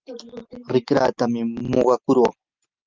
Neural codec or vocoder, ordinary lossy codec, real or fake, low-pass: none; Opus, 24 kbps; real; 7.2 kHz